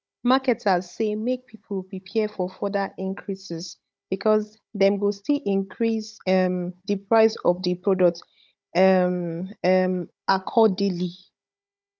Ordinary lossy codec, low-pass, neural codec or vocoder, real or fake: none; none; codec, 16 kHz, 16 kbps, FunCodec, trained on Chinese and English, 50 frames a second; fake